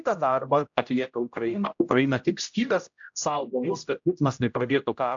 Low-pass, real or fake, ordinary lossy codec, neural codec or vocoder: 7.2 kHz; fake; AAC, 64 kbps; codec, 16 kHz, 0.5 kbps, X-Codec, HuBERT features, trained on general audio